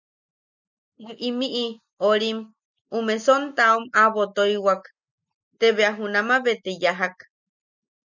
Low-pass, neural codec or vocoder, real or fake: 7.2 kHz; none; real